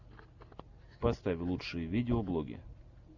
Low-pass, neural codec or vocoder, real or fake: 7.2 kHz; none; real